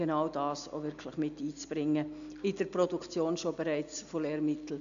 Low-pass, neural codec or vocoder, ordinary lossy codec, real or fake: 7.2 kHz; none; none; real